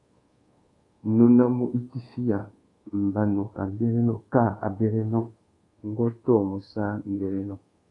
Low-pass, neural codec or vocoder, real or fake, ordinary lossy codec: 10.8 kHz; codec, 24 kHz, 1.2 kbps, DualCodec; fake; AAC, 32 kbps